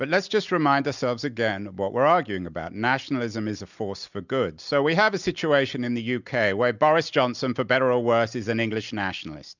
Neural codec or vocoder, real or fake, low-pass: none; real; 7.2 kHz